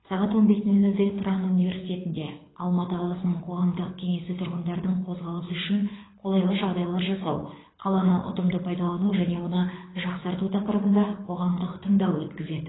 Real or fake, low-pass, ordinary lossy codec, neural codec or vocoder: fake; 7.2 kHz; AAC, 16 kbps; codec, 24 kHz, 6 kbps, HILCodec